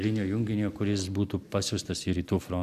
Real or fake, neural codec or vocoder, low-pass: real; none; 14.4 kHz